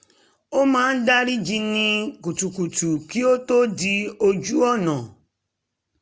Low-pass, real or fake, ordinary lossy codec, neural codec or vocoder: none; real; none; none